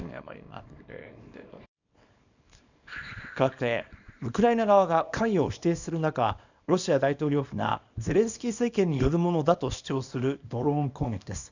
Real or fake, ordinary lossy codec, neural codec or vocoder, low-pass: fake; none; codec, 24 kHz, 0.9 kbps, WavTokenizer, small release; 7.2 kHz